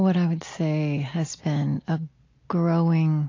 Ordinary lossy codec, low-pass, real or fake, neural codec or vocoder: AAC, 32 kbps; 7.2 kHz; real; none